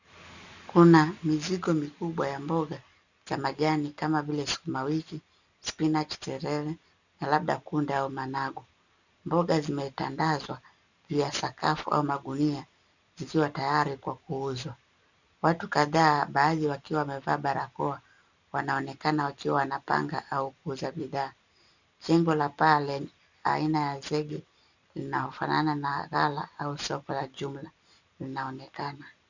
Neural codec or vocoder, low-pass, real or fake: none; 7.2 kHz; real